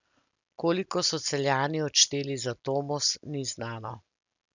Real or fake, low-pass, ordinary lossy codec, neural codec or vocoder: real; 7.2 kHz; none; none